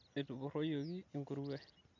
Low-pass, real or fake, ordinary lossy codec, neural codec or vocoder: 7.2 kHz; real; MP3, 48 kbps; none